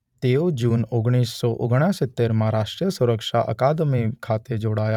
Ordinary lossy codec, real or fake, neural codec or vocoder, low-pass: none; fake; vocoder, 44.1 kHz, 128 mel bands every 512 samples, BigVGAN v2; 19.8 kHz